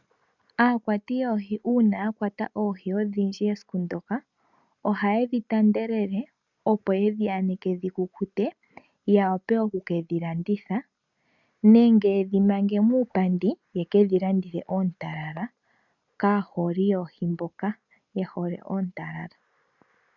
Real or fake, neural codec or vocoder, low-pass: real; none; 7.2 kHz